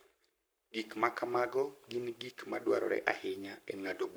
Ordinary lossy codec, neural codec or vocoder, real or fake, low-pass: none; codec, 44.1 kHz, 7.8 kbps, Pupu-Codec; fake; none